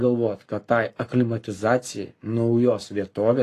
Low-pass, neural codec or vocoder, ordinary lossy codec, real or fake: 14.4 kHz; codec, 44.1 kHz, 7.8 kbps, Pupu-Codec; AAC, 48 kbps; fake